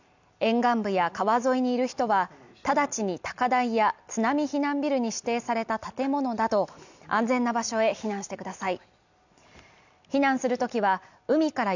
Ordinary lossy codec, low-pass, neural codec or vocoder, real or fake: none; 7.2 kHz; none; real